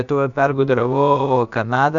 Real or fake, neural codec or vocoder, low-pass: fake; codec, 16 kHz, about 1 kbps, DyCAST, with the encoder's durations; 7.2 kHz